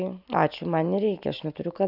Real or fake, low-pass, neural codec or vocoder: real; 5.4 kHz; none